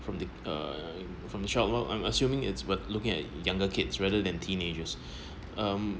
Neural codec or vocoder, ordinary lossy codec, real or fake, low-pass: none; none; real; none